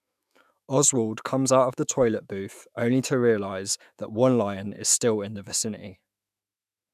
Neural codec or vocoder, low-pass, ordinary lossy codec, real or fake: autoencoder, 48 kHz, 128 numbers a frame, DAC-VAE, trained on Japanese speech; 14.4 kHz; none; fake